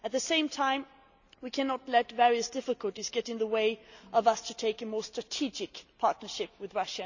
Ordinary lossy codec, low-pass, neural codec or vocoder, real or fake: none; 7.2 kHz; none; real